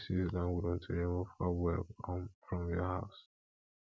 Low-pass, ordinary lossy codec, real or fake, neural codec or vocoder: none; none; real; none